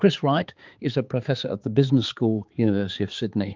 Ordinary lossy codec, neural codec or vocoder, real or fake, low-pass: Opus, 24 kbps; codec, 16 kHz, 4 kbps, X-Codec, HuBERT features, trained on balanced general audio; fake; 7.2 kHz